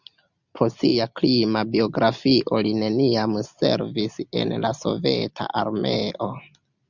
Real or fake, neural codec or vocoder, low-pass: real; none; 7.2 kHz